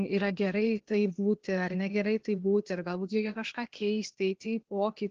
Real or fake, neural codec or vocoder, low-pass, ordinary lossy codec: fake; codec, 16 kHz, 0.8 kbps, ZipCodec; 7.2 kHz; Opus, 16 kbps